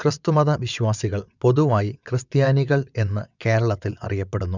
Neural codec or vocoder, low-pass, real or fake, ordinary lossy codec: vocoder, 22.05 kHz, 80 mel bands, WaveNeXt; 7.2 kHz; fake; none